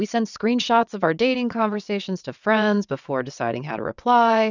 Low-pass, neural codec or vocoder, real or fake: 7.2 kHz; vocoder, 22.05 kHz, 80 mel bands, WaveNeXt; fake